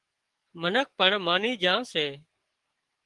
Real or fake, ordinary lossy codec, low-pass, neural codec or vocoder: fake; Opus, 24 kbps; 10.8 kHz; codec, 44.1 kHz, 7.8 kbps, DAC